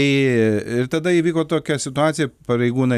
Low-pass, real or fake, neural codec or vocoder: 14.4 kHz; real; none